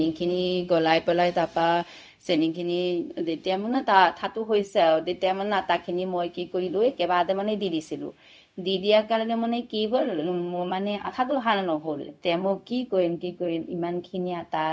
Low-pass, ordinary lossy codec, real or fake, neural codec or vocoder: none; none; fake; codec, 16 kHz, 0.4 kbps, LongCat-Audio-Codec